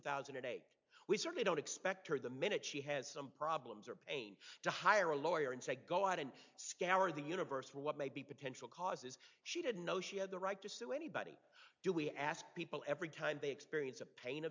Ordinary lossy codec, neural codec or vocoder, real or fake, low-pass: MP3, 64 kbps; none; real; 7.2 kHz